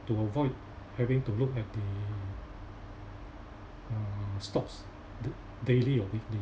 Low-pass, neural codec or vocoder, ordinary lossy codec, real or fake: none; none; none; real